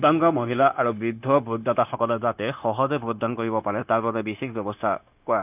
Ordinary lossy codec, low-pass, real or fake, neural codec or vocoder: none; 3.6 kHz; fake; codec, 16 kHz, 0.9 kbps, LongCat-Audio-Codec